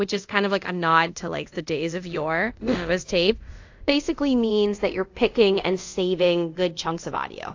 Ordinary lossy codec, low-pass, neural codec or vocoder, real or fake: AAC, 48 kbps; 7.2 kHz; codec, 24 kHz, 0.5 kbps, DualCodec; fake